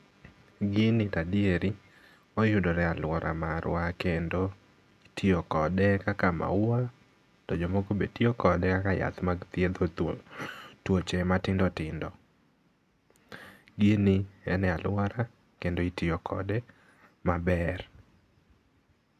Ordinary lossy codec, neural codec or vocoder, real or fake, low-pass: none; none; real; 14.4 kHz